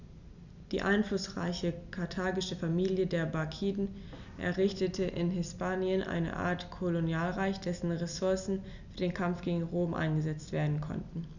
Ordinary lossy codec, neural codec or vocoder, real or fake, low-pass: none; none; real; 7.2 kHz